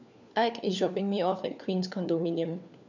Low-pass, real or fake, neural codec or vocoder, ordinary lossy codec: 7.2 kHz; fake; codec, 16 kHz, 4 kbps, FunCodec, trained on LibriTTS, 50 frames a second; none